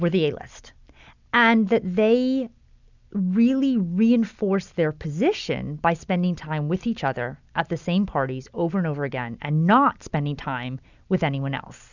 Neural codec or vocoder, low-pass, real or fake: none; 7.2 kHz; real